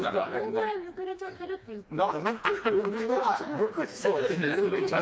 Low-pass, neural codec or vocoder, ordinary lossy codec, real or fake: none; codec, 16 kHz, 2 kbps, FreqCodec, smaller model; none; fake